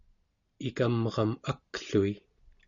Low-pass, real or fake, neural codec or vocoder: 7.2 kHz; real; none